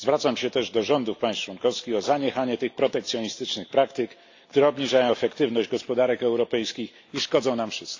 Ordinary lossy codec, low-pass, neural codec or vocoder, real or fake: AAC, 48 kbps; 7.2 kHz; none; real